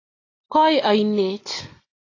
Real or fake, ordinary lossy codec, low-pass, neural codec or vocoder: real; AAC, 32 kbps; 7.2 kHz; none